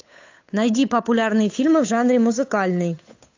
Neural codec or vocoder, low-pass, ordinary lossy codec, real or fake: codec, 16 kHz, 8 kbps, FunCodec, trained on Chinese and English, 25 frames a second; 7.2 kHz; AAC, 48 kbps; fake